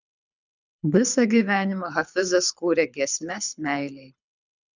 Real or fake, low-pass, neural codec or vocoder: fake; 7.2 kHz; codec, 24 kHz, 6 kbps, HILCodec